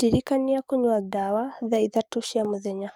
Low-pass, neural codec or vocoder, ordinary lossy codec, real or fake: 19.8 kHz; codec, 44.1 kHz, 7.8 kbps, DAC; none; fake